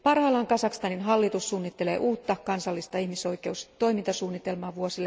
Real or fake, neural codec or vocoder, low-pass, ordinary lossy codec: real; none; none; none